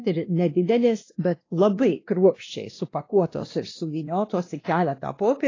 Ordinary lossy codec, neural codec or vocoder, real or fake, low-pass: AAC, 32 kbps; codec, 16 kHz, 1 kbps, X-Codec, WavLM features, trained on Multilingual LibriSpeech; fake; 7.2 kHz